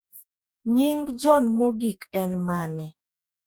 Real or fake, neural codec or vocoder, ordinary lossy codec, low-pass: fake; codec, 44.1 kHz, 2.6 kbps, DAC; none; none